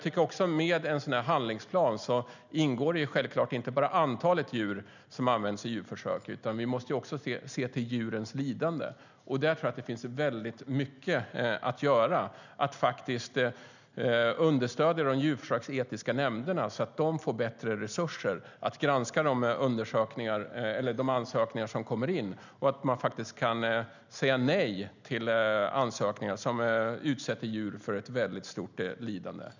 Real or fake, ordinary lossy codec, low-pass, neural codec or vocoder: real; none; 7.2 kHz; none